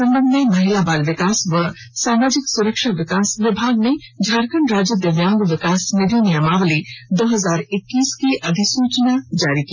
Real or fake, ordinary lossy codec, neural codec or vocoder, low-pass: real; none; none; none